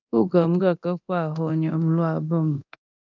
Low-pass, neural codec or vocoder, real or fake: 7.2 kHz; codec, 24 kHz, 0.9 kbps, DualCodec; fake